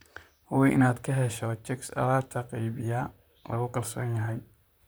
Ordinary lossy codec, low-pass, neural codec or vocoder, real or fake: none; none; vocoder, 44.1 kHz, 128 mel bands, Pupu-Vocoder; fake